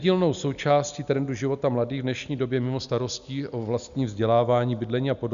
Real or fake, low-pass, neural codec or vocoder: real; 7.2 kHz; none